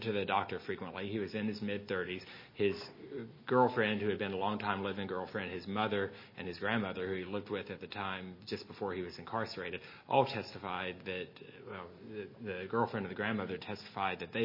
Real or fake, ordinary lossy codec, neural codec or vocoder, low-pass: real; MP3, 24 kbps; none; 5.4 kHz